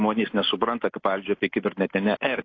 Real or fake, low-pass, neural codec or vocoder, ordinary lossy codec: real; 7.2 kHz; none; AAC, 32 kbps